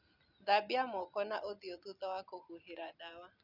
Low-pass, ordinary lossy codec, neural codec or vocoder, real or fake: 5.4 kHz; none; none; real